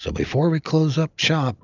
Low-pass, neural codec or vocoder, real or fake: 7.2 kHz; none; real